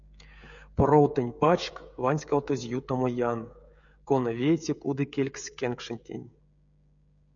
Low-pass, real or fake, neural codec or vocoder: 7.2 kHz; fake; codec, 16 kHz, 16 kbps, FreqCodec, smaller model